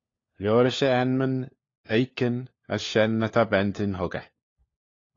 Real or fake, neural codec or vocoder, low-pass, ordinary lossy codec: fake; codec, 16 kHz, 4 kbps, FunCodec, trained on LibriTTS, 50 frames a second; 7.2 kHz; AAC, 32 kbps